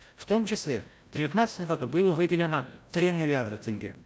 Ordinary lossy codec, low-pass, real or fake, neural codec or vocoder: none; none; fake; codec, 16 kHz, 0.5 kbps, FreqCodec, larger model